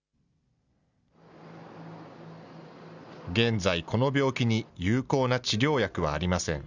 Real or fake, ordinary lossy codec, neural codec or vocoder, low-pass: real; none; none; 7.2 kHz